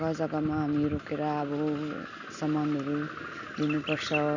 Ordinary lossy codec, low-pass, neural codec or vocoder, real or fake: none; 7.2 kHz; none; real